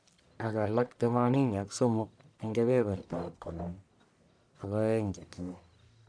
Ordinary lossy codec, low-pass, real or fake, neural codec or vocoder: none; 9.9 kHz; fake; codec, 44.1 kHz, 1.7 kbps, Pupu-Codec